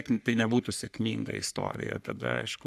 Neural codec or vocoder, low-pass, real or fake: codec, 44.1 kHz, 3.4 kbps, Pupu-Codec; 14.4 kHz; fake